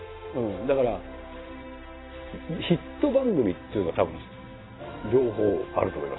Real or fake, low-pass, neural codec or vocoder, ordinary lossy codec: fake; 7.2 kHz; vocoder, 44.1 kHz, 128 mel bands every 512 samples, BigVGAN v2; AAC, 16 kbps